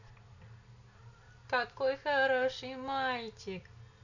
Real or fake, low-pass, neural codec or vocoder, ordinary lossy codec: real; 7.2 kHz; none; none